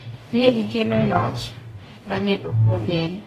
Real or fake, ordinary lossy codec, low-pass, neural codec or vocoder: fake; AAC, 48 kbps; 14.4 kHz; codec, 44.1 kHz, 0.9 kbps, DAC